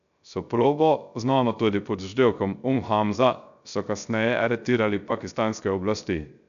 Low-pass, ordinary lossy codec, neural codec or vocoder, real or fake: 7.2 kHz; none; codec, 16 kHz, 0.3 kbps, FocalCodec; fake